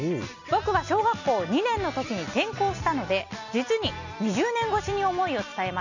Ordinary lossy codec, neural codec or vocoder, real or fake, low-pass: none; none; real; 7.2 kHz